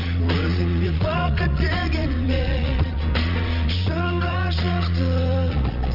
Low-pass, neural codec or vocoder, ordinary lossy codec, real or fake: 5.4 kHz; vocoder, 22.05 kHz, 80 mel bands, Vocos; Opus, 32 kbps; fake